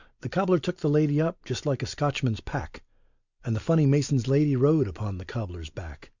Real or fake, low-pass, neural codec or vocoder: real; 7.2 kHz; none